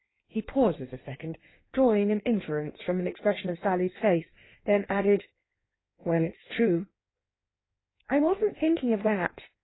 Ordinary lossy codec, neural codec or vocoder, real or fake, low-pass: AAC, 16 kbps; codec, 16 kHz in and 24 kHz out, 1.1 kbps, FireRedTTS-2 codec; fake; 7.2 kHz